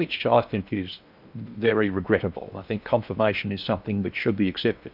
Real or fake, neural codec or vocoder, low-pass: fake; codec, 16 kHz in and 24 kHz out, 0.8 kbps, FocalCodec, streaming, 65536 codes; 5.4 kHz